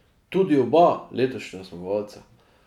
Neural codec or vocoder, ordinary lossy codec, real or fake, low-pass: none; none; real; 19.8 kHz